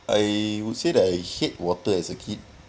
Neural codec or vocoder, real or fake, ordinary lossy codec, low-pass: none; real; none; none